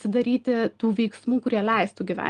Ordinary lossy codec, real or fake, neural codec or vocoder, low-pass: Opus, 24 kbps; real; none; 9.9 kHz